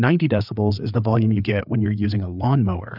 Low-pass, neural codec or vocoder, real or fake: 5.4 kHz; codec, 16 kHz, 4 kbps, FreqCodec, larger model; fake